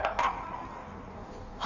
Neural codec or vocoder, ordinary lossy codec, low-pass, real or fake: codec, 16 kHz in and 24 kHz out, 1.1 kbps, FireRedTTS-2 codec; none; 7.2 kHz; fake